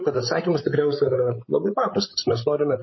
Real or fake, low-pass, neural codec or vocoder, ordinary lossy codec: fake; 7.2 kHz; codec, 16 kHz, 16 kbps, FreqCodec, larger model; MP3, 24 kbps